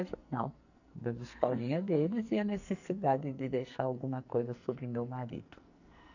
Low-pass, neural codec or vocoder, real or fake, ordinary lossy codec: 7.2 kHz; codec, 44.1 kHz, 2.6 kbps, SNAC; fake; none